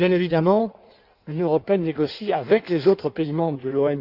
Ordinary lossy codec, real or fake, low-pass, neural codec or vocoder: none; fake; 5.4 kHz; codec, 16 kHz in and 24 kHz out, 1.1 kbps, FireRedTTS-2 codec